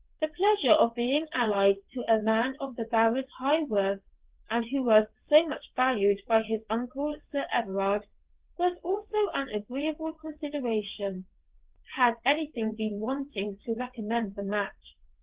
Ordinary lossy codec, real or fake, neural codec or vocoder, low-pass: Opus, 16 kbps; fake; vocoder, 22.05 kHz, 80 mel bands, WaveNeXt; 3.6 kHz